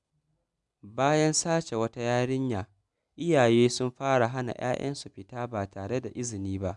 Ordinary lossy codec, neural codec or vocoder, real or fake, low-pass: none; none; real; 10.8 kHz